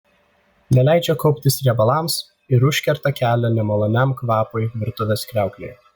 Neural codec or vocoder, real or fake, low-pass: none; real; 19.8 kHz